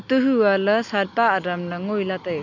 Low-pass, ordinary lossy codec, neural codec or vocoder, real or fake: 7.2 kHz; none; none; real